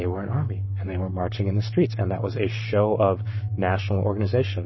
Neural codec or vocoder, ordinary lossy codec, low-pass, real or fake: codec, 44.1 kHz, 7.8 kbps, Pupu-Codec; MP3, 24 kbps; 7.2 kHz; fake